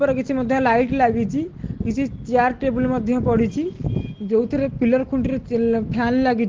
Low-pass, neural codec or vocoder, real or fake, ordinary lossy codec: 7.2 kHz; none; real; Opus, 16 kbps